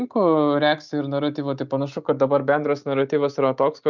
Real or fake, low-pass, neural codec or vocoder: real; 7.2 kHz; none